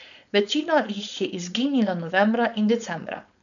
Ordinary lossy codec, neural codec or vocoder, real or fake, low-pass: none; codec, 16 kHz, 4.8 kbps, FACodec; fake; 7.2 kHz